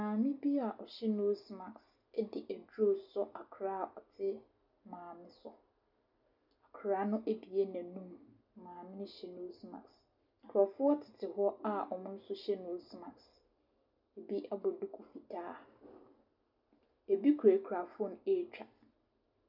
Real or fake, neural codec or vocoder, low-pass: real; none; 5.4 kHz